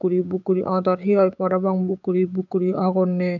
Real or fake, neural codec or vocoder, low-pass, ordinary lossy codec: fake; codec, 16 kHz, 6 kbps, DAC; 7.2 kHz; none